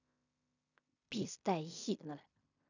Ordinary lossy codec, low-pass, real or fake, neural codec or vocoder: none; 7.2 kHz; fake; codec, 16 kHz in and 24 kHz out, 0.4 kbps, LongCat-Audio-Codec, fine tuned four codebook decoder